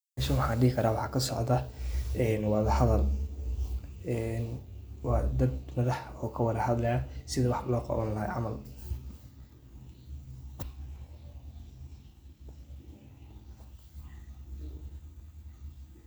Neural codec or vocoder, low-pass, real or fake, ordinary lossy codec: none; none; real; none